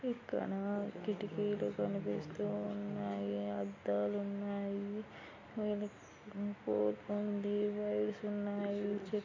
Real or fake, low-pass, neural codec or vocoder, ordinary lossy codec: real; 7.2 kHz; none; MP3, 32 kbps